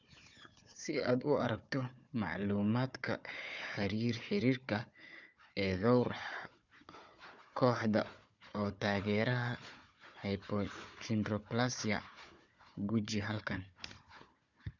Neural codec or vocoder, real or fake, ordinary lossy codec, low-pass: codec, 16 kHz, 4 kbps, FunCodec, trained on Chinese and English, 50 frames a second; fake; none; 7.2 kHz